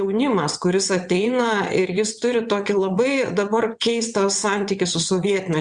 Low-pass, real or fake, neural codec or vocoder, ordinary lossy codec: 9.9 kHz; fake; vocoder, 22.05 kHz, 80 mel bands, WaveNeXt; Opus, 64 kbps